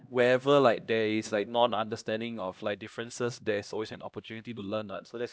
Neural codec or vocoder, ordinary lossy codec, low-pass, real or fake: codec, 16 kHz, 1 kbps, X-Codec, HuBERT features, trained on LibriSpeech; none; none; fake